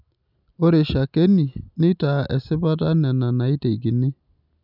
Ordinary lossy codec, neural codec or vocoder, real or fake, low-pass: none; none; real; 5.4 kHz